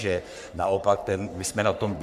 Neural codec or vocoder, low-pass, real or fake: codec, 44.1 kHz, 3.4 kbps, Pupu-Codec; 14.4 kHz; fake